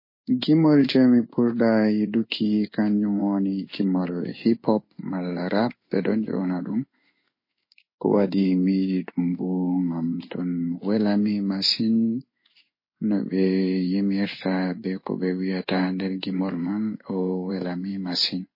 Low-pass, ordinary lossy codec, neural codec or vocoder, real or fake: 5.4 kHz; MP3, 24 kbps; codec, 16 kHz in and 24 kHz out, 1 kbps, XY-Tokenizer; fake